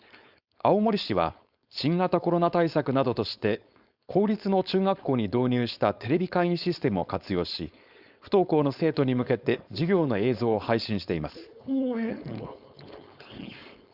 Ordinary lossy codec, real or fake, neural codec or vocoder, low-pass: Opus, 64 kbps; fake; codec, 16 kHz, 4.8 kbps, FACodec; 5.4 kHz